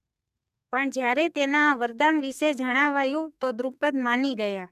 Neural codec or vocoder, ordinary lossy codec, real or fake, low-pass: codec, 32 kHz, 1.9 kbps, SNAC; none; fake; 14.4 kHz